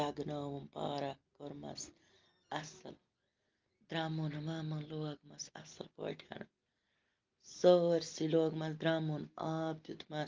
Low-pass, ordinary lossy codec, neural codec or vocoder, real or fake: 7.2 kHz; Opus, 24 kbps; none; real